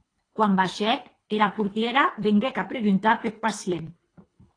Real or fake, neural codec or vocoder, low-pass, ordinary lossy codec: fake; codec, 24 kHz, 3 kbps, HILCodec; 9.9 kHz; AAC, 32 kbps